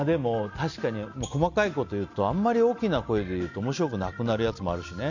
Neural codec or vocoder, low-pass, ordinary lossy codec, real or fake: none; 7.2 kHz; none; real